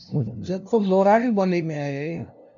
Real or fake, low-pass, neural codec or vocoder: fake; 7.2 kHz; codec, 16 kHz, 0.5 kbps, FunCodec, trained on LibriTTS, 25 frames a second